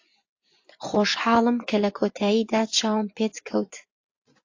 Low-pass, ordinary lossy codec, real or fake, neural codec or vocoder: 7.2 kHz; AAC, 48 kbps; fake; vocoder, 24 kHz, 100 mel bands, Vocos